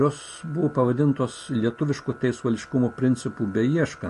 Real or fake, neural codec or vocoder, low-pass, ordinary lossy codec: real; none; 14.4 kHz; MP3, 48 kbps